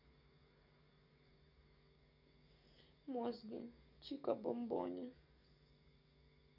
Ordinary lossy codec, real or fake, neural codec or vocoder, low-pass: none; fake; codec, 44.1 kHz, 7.8 kbps, DAC; 5.4 kHz